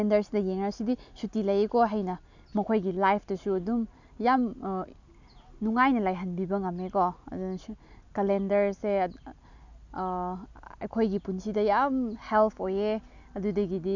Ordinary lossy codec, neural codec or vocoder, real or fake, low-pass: none; none; real; 7.2 kHz